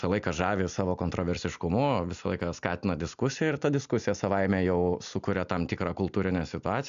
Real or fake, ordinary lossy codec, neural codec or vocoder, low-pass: real; AAC, 96 kbps; none; 7.2 kHz